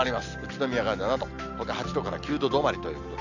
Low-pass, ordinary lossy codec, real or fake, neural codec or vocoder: 7.2 kHz; none; real; none